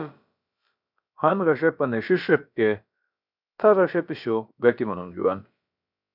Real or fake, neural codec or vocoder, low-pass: fake; codec, 16 kHz, about 1 kbps, DyCAST, with the encoder's durations; 5.4 kHz